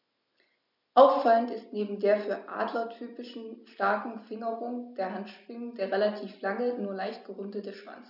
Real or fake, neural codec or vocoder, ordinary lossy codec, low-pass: real; none; none; 5.4 kHz